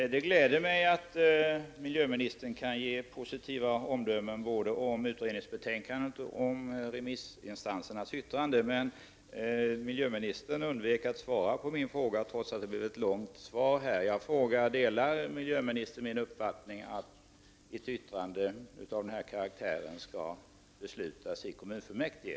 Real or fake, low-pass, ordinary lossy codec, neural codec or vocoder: real; none; none; none